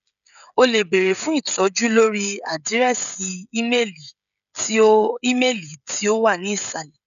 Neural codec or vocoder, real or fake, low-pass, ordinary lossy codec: codec, 16 kHz, 16 kbps, FreqCodec, smaller model; fake; 7.2 kHz; none